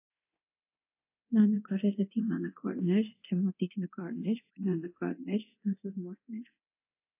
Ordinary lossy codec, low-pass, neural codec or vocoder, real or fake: AAC, 24 kbps; 3.6 kHz; codec, 24 kHz, 0.9 kbps, DualCodec; fake